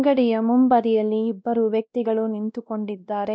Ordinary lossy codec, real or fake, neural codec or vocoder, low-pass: none; fake; codec, 16 kHz, 1 kbps, X-Codec, WavLM features, trained on Multilingual LibriSpeech; none